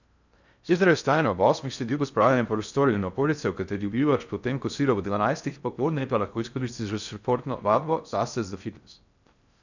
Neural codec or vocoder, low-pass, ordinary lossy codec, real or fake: codec, 16 kHz in and 24 kHz out, 0.6 kbps, FocalCodec, streaming, 4096 codes; 7.2 kHz; none; fake